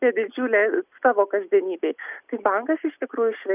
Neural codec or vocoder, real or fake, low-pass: none; real; 3.6 kHz